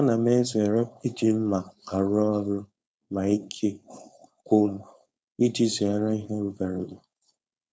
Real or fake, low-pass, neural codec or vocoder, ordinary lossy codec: fake; none; codec, 16 kHz, 4.8 kbps, FACodec; none